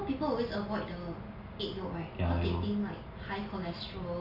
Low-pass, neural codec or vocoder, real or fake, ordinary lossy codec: 5.4 kHz; none; real; none